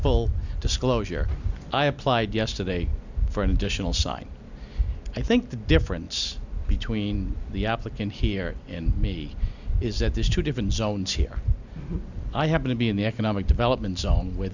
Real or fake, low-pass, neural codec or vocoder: real; 7.2 kHz; none